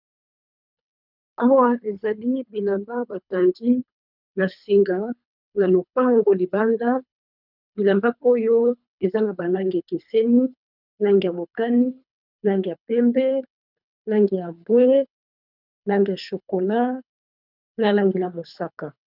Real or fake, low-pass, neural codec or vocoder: fake; 5.4 kHz; codec, 24 kHz, 3 kbps, HILCodec